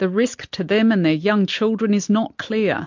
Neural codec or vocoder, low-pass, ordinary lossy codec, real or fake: none; 7.2 kHz; MP3, 48 kbps; real